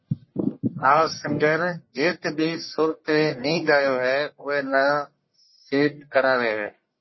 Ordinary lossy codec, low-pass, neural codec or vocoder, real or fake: MP3, 24 kbps; 7.2 kHz; codec, 44.1 kHz, 1.7 kbps, Pupu-Codec; fake